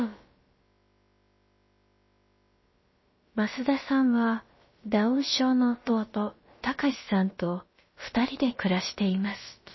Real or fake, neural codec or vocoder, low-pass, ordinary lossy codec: fake; codec, 16 kHz, about 1 kbps, DyCAST, with the encoder's durations; 7.2 kHz; MP3, 24 kbps